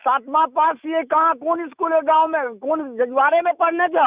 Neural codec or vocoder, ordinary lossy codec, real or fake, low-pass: autoencoder, 48 kHz, 128 numbers a frame, DAC-VAE, trained on Japanese speech; Opus, 64 kbps; fake; 3.6 kHz